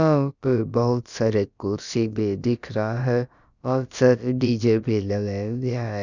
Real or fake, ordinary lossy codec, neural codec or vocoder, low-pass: fake; Opus, 64 kbps; codec, 16 kHz, about 1 kbps, DyCAST, with the encoder's durations; 7.2 kHz